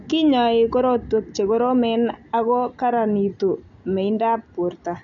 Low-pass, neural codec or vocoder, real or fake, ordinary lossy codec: 7.2 kHz; none; real; none